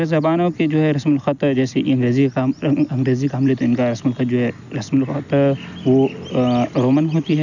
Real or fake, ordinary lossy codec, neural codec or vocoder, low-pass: real; none; none; 7.2 kHz